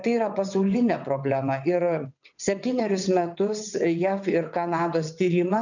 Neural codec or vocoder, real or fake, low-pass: vocoder, 22.05 kHz, 80 mel bands, WaveNeXt; fake; 7.2 kHz